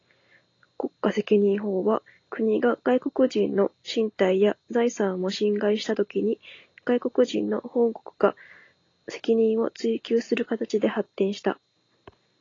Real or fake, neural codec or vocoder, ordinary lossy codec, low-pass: real; none; AAC, 32 kbps; 7.2 kHz